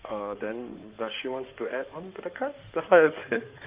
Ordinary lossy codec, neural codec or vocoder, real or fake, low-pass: Opus, 24 kbps; codec, 16 kHz in and 24 kHz out, 2.2 kbps, FireRedTTS-2 codec; fake; 3.6 kHz